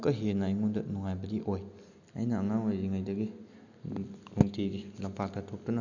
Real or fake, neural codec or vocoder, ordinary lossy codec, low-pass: real; none; none; 7.2 kHz